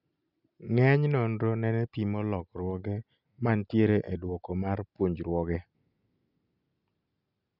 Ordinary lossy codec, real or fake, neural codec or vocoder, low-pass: none; real; none; 5.4 kHz